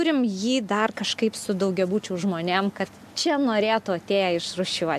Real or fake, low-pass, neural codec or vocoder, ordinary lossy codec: real; 14.4 kHz; none; AAC, 96 kbps